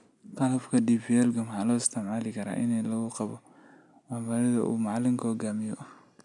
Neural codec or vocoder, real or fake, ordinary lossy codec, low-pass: none; real; none; 10.8 kHz